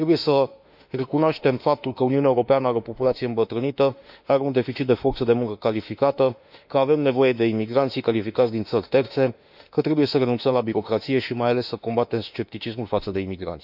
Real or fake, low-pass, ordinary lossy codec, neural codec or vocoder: fake; 5.4 kHz; none; autoencoder, 48 kHz, 32 numbers a frame, DAC-VAE, trained on Japanese speech